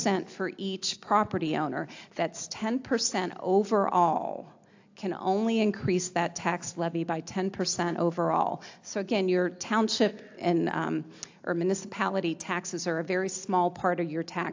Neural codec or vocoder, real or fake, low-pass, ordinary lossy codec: none; real; 7.2 kHz; AAC, 48 kbps